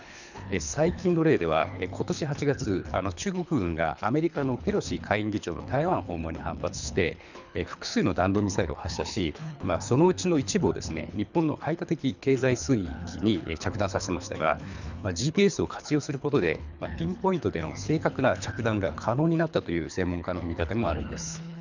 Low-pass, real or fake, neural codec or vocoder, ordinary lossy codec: 7.2 kHz; fake; codec, 24 kHz, 3 kbps, HILCodec; none